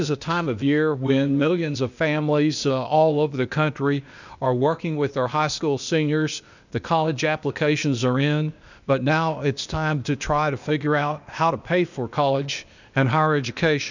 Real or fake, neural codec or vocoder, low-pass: fake; codec, 16 kHz, 0.8 kbps, ZipCodec; 7.2 kHz